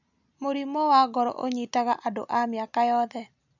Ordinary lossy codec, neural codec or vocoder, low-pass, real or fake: none; none; 7.2 kHz; real